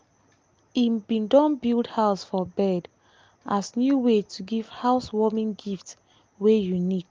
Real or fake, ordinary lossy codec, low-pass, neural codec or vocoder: real; Opus, 32 kbps; 7.2 kHz; none